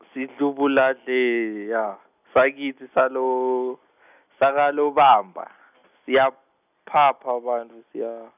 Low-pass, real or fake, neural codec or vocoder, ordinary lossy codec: 3.6 kHz; real; none; none